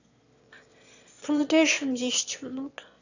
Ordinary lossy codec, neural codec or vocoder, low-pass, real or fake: none; autoencoder, 22.05 kHz, a latent of 192 numbers a frame, VITS, trained on one speaker; 7.2 kHz; fake